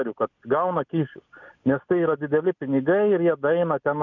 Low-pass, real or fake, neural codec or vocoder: 7.2 kHz; real; none